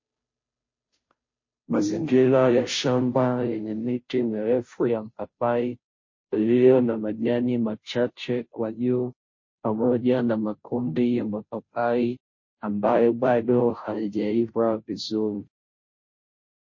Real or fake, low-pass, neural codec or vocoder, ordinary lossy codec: fake; 7.2 kHz; codec, 16 kHz, 0.5 kbps, FunCodec, trained on Chinese and English, 25 frames a second; MP3, 32 kbps